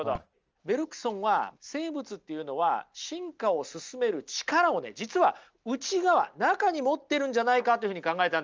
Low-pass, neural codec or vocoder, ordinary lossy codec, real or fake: 7.2 kHz; none; Opus, 24 kbps; real